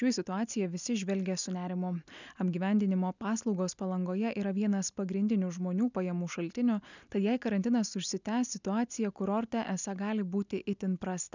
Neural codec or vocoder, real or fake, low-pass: none; real; 7.2 kHz